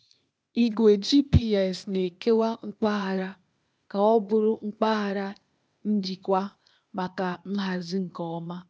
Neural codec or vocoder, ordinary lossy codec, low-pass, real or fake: codec, 16 kHz, 0.8 kbps, ZipCodec; none; none; fake